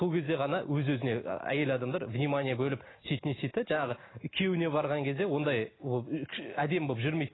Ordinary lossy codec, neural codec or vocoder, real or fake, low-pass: AAC, 16 kbps; none; real; 7.2 kHz